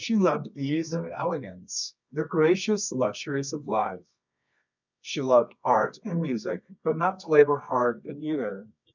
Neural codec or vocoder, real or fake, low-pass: codec, 24 kHz, 0.9 kbps, WavTokenizer, medium music audio release; fake; 7.2 kHz